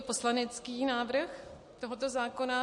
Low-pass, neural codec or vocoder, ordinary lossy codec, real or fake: 10.8 kHz; none; MP3, 48 kbps; real